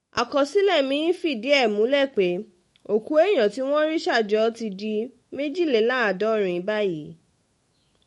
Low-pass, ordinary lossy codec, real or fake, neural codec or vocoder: 19.8 kHz; MP3, 48 kbps; fake; autoencoder, 48 kHz, 128 numbers a frame, DAC-VAE, trained on Japanese speech